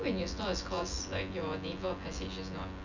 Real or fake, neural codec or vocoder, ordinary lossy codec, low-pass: fake; vocoder, 24 kHz, 100 mel bands, Vocos; none; 7.2 kHz